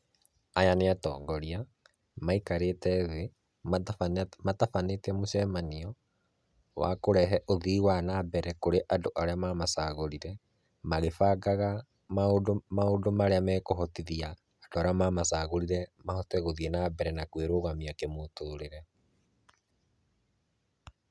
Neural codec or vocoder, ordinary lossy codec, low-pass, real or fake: none; none; none; real